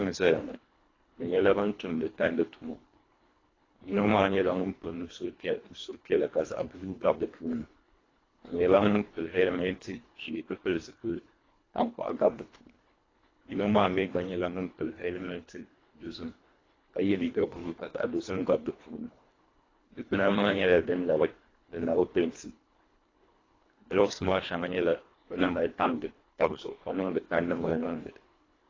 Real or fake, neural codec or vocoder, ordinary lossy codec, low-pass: fake; codec, 24 kHz, 1.5 kbps, HILCodec; AAC, 32 kbps; 7.2 kHz